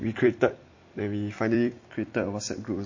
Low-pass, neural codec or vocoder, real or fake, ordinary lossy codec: 7.2 kHz; vocoder, 44.1 kHz, 128 mel bands every 256 samples, BigVGAN v2; fake; MP3, 32 kbps